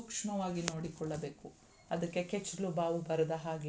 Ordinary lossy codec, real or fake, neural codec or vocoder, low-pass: none; real; none; none